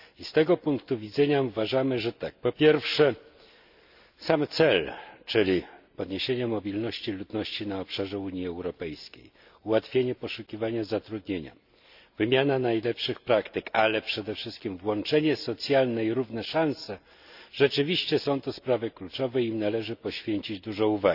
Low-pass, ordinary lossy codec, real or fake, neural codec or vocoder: 5.4 kHz; none; real; none